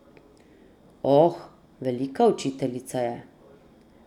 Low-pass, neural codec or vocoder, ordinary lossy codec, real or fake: 19.8 kHz; none; none; real